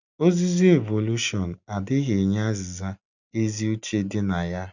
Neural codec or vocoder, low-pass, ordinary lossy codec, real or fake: none; 7.2 kHz; none; real